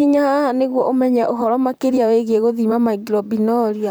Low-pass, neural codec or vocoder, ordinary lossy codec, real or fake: none; vocoder, 44.1 kHz, 128 mel bands, Pupu-Vocoder; none; fake